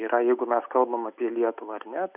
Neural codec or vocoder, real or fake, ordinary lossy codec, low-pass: none; real; Opus, 64 kbps; 3.6 kHz